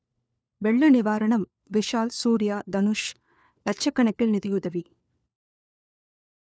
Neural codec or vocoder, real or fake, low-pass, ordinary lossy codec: codec, 16 kHz, 4 kbps, FunCodec, trained on LibriTTS, 50 frames a second; fake; none; none